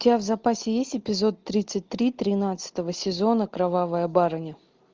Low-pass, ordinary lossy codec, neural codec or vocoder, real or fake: 7.2 kHz; Opus, 24 kbps; none; real